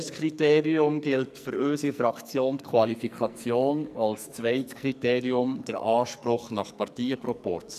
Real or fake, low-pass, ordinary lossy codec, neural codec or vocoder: fake; 14.4 kHz; none; codec, 44.1 kHz, 2.6 kbps, SNAC